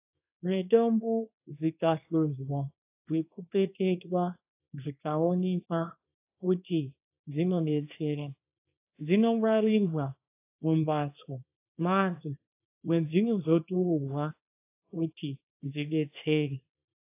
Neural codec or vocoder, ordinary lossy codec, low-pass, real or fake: codec, 24 kHz, 0.9 kbps, WavTokenizer, small release; AAC, 24 kbps; 3.6 kHz; fake